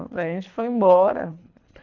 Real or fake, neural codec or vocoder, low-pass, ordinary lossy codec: fake; codec, 24 kHz, 3 kbps, HILCodec; 7.2 kHz; none